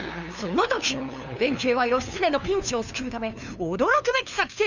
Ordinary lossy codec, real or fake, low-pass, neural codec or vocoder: none; fake; 7.2 kHz; codec, 16 kHz, 2 kbps, FunCodec, trained on LibriTTS, 25 frames a second